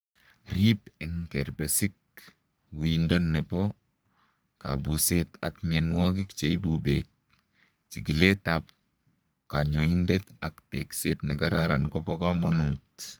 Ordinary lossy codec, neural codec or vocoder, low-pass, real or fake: none; codec, 44.1 kHz, 3.4 kbps, Pupu-Codec; none; fake